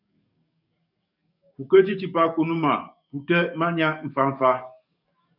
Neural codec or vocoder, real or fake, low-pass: codec, 44.1 kHz, 7.8 kbps, DAC; fake; 5.4 kHz